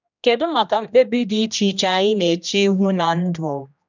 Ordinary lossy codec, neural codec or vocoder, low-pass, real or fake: none; codec, 16 kHz, 1 kbps, X-Codec, HuBERT features, trained on general audio; 7.2 kHz; fake